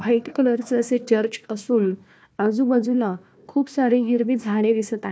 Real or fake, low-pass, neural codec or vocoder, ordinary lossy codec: fake; none; codec, 16 kHz, 1 kbps, FunCodec, trained on Chinese and English, 50 frames a second; none